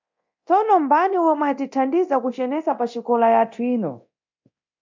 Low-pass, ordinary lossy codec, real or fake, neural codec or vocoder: 7.2 kHz; MP3, 64 kbps; fake; codec, 24 kHz, 0.9 kbps, DualCodec